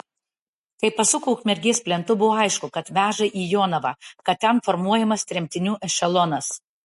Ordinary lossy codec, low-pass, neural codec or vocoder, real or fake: MP3, 48 kbps; 14.4 kHz; none; real